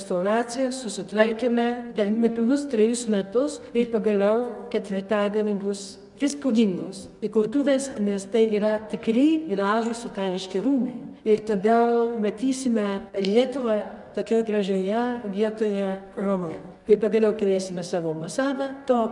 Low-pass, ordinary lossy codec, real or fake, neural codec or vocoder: 10.8 kHz; MP3, 96 kbps; fake; codec, 24 kHz, 0.9 kbps, WavTokenizer, medium music audio release